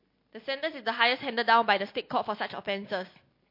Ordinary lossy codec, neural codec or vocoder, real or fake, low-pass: MP3, 32 kbps; none; real; 5.4 kHz